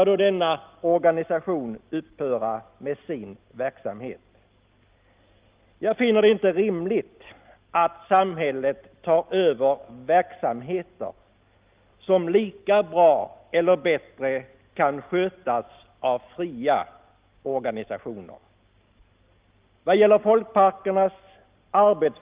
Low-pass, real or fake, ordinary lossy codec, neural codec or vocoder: 3.6 kHz; real; Opus, 32 kbps; none